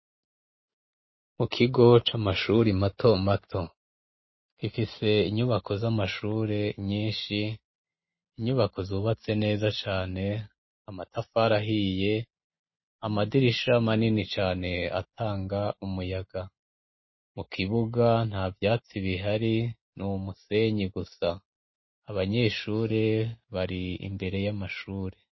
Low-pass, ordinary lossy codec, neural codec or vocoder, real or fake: 7.2 kHz; MP3, 24 kbps; none; real